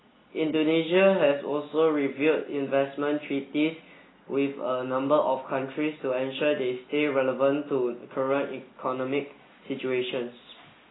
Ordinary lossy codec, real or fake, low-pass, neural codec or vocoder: AAC, 16 kbps; real; 7.2 kHz; none